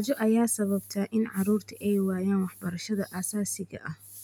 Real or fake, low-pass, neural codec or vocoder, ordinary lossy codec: real; none; none; none